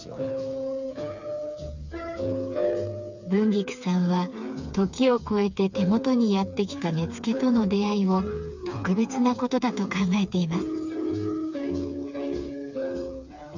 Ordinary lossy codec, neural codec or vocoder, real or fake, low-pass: none; codec, 16 kHz, 4 kbps, FreqCodec, smaller model; fake; 7.2 kHz